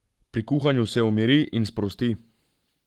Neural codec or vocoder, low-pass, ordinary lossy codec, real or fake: codec, 44.1 kHz, 7.8 kbps, Pupu-Codec; 19.8 kHz; Opus, 24 kbps; fake